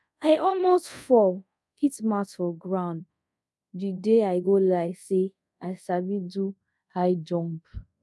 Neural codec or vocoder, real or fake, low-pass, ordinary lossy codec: codec, 24 kHz, 0.5 kbps, DualCodec; fake; none; none